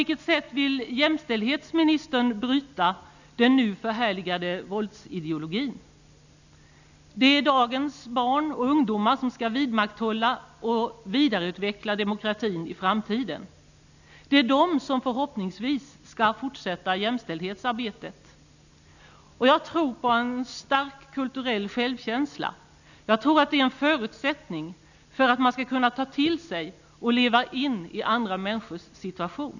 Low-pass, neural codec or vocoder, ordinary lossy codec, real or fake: 7.2 kHz; none; none; real